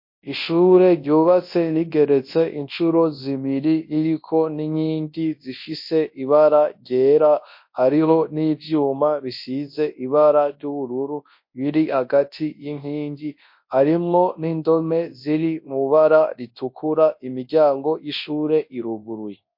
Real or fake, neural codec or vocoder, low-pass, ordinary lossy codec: fake; codec, 24 kHz, 0.9 kbps, WavTokenizer, large speech release; 5.4 kHz; MP3, 32 kbps